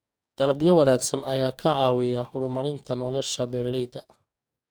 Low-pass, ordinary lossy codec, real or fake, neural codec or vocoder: none; none; fake; codec, 44.1 kHz, 2.6 kbps, DAC